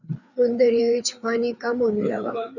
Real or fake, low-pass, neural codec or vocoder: fake; 7.2 kHz; codec, 16 kHz, 4 kbps, FreqCodec, larger model